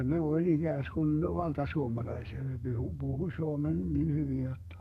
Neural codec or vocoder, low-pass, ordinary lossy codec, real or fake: codec, 32 kHz, 1.9 kbps, SNAC; 14.4 kHz; none; fake